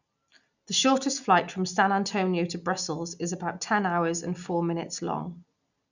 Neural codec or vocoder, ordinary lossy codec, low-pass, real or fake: none; none; 7.2 kHz; real